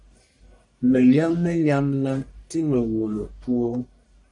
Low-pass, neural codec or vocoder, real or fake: 10.8 kHz; codec, 44.1 kHz, 1.7 kbps, Pupu-Codec; fake